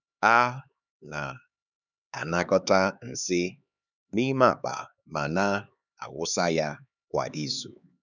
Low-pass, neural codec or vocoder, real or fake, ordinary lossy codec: 7.2 kHz; codec, 16 kHz, 4 kbps, X-Codec, HuBERT features, trained on LibriSpeech; fake; none